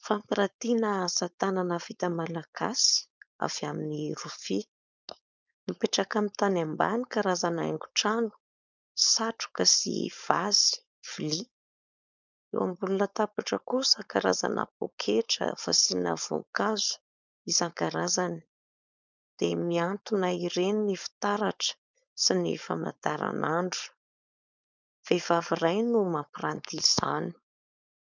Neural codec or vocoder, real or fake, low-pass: codec, 16 kHz, 4.8 kbps, FACodec; fake; 7.2 kHz